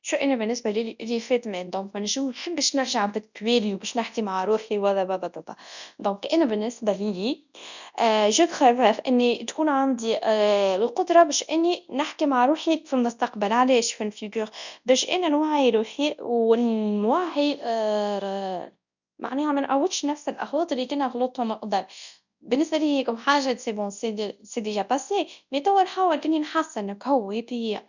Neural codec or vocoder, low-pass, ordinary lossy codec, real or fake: codec, 24 kHz, 0.9 kbps, WavTokenizer, large speech release; 7.2 kHz; none; fake